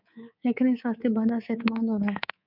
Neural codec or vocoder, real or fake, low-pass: codec, 24 kHz, 3.1 kbps, DualCodec; fake; 5.4 kHz